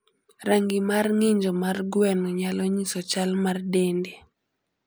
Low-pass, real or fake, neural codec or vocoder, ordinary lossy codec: none; real; none; none